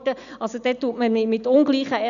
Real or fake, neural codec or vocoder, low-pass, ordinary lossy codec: real; none; 7.2 kHz; none